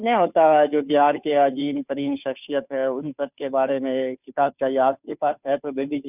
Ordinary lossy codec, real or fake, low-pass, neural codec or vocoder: none; fake; 3.6 kHz; codec, 16 kHz, 2 kbps, FunCodec, trained on Chinese and English, 25 frames a second